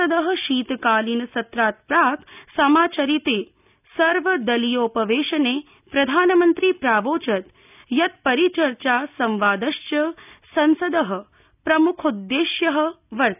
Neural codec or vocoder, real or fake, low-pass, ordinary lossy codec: none; real; 3.6 kHz; none